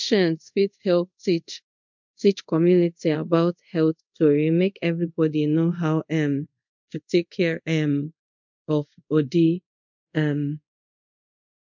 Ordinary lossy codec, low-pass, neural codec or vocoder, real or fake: MP3, 64 kbps; 7.2 kHz; codec, 24 kHz, 0.5 kbps, DualCodec; fake